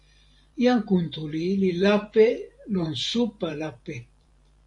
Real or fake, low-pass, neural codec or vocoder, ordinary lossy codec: fake; 10.8 kHz; vocoder, 44.1 kHz, 128 mel bands every 512 samples, BigVGAN v2; AAC, 64 kbps